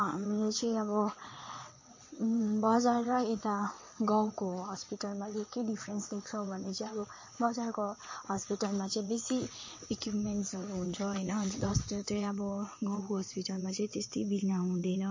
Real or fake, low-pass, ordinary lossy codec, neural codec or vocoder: fake; 7.2 kHz; MP3, 32 kbps; vocoder, 22.05 kHz, 80 mel bands, WaveNeXt